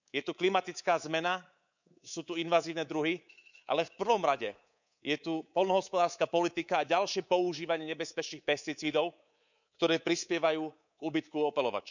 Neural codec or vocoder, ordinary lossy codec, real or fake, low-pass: codec, 24 kHz, 3.1 kbps, DualCodec; none; fake; 7.2 kHz